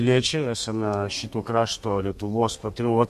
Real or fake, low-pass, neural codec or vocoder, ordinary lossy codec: fake; 14.4 kHz; codec, 32 kHz, 1.9 kbps, SNAC; MP3, 64 kbps